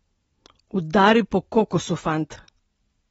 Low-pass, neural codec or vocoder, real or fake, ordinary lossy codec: 19.8 kHz; none; real; AAC, 24 kbps